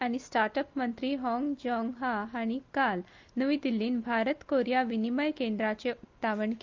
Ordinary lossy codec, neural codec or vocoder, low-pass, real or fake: Opus, 24 kbps; none; 7.2 kHz; real